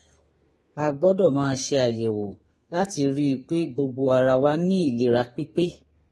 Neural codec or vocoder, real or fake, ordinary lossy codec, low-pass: codec, 32 kHz, 1.9 kbps, SNAC; fake; AAC, 32 kbps; 14.4 kHz